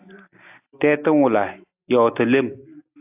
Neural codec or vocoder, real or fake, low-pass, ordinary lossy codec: none; real; 3.6 kHz; AAC, 32 kbps